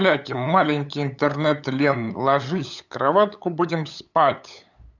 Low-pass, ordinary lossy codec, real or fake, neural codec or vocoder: 7.2 kHz; none; fake; codec, 16 kHz, 8 kbps, FunCodec, trained on LibriTTS, 25 frames a second